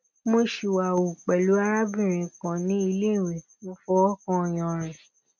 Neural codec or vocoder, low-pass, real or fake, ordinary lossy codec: none; 7.2 kHz; real; none